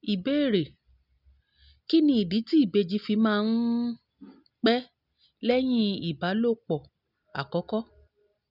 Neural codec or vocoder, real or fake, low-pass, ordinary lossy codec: none; real; 5.4 kHz; none